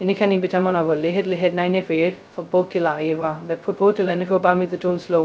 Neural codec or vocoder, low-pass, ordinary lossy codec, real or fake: codec, 16 kHz, 0.2 kbps, FocalCodec; none; none; fake